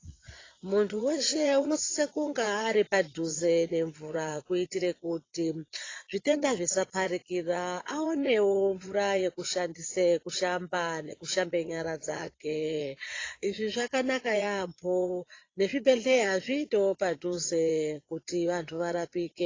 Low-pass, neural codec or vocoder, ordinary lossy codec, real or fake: 7.2 kHz; vocoder, 22.05 kHz, 80 mel bands, Vocos; AAC, 32 kbps; fake